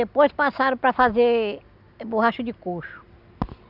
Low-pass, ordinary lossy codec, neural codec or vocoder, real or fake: 5.4 kHz; none; none; real